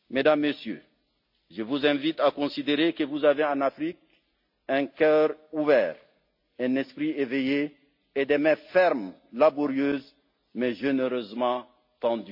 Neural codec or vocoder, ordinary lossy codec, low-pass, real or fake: none; MP3, 48 kbps; 5.4 kHz; real